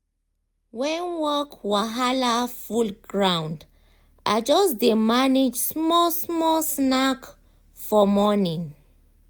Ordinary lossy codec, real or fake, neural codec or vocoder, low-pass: none; real; none; none